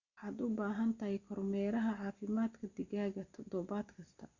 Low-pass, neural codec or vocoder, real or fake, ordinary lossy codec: 7.2 kHz; none; real; Opus, 64 kbps